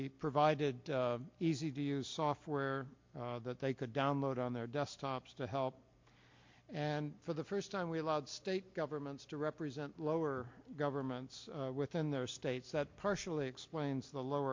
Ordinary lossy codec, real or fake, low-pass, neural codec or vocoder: MP3, 48 kbps; real; 7.2 kHz; none